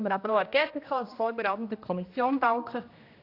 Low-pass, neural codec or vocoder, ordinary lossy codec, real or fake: 5.4 kHz; codec, 16 kHz, 1 kbps, X-Codec, HuBERT features, trained on general audio; AAC, 32 kbps; fake